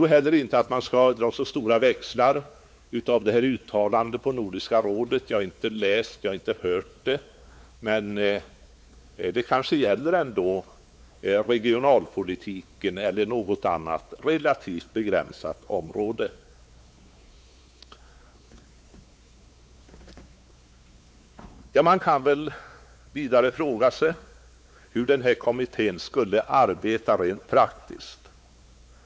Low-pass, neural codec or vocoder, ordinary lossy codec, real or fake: none; codec, 16 kHz, 4 kbps, X-Codec, WavLM features, trained on Multilingual LibriSpeech; none; fake